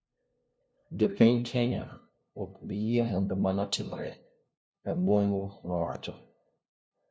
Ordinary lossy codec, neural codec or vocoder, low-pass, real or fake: none; codec, 16 kHz, 0.5 kbps, FunCodec, trained on LibriTTS, 25 frames a second; none; fake